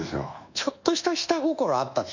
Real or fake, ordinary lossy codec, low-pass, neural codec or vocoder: fake; none; 7.2 kHz; codec, 24 kHz, 1.2 kbps, DualCodec